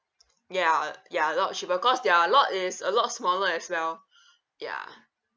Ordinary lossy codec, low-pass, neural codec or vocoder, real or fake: none; none; none; real